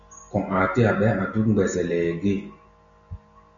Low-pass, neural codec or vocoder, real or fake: 7.2 kHz; none; real